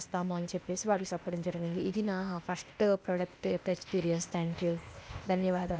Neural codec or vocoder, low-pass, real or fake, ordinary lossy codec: codec, 16 kHz, 0.8 kbps, ZipCodec; none; fake; none